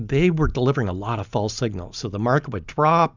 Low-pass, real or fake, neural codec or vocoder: 7.2 kHz; real; none